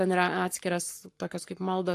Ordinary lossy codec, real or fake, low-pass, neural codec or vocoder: AAC, 64 kbps; fake; 14.4 kHz; codec, 44.1 kHz, 7.8 kbps, Pupu-Codec